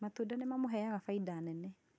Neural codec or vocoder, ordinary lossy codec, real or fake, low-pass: none; none; real; none